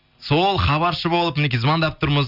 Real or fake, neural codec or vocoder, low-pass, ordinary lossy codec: real; none; 5.4 kHz; none